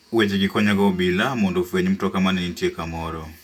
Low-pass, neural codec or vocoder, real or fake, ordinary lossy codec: 19.8 kHz; vocoder, 48 kHz, 128 mel bands, Vocos; fake; none